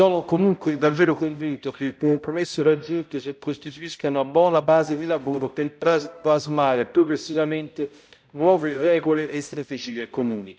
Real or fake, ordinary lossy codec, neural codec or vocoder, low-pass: fake; none; codec, 16 kHz, 0.5 kbps, X-Codec, HuBERT features, trained on balanced general audio; none